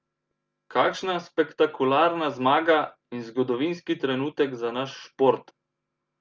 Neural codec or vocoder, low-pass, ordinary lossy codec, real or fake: none; 7.2 kHz; Opus, 24 kbps; real